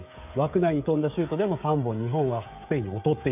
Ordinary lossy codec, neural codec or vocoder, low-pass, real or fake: none; codec, 16 kHz, 16 kbps, FreqCodec, smaller model; 3.6 kHz; fake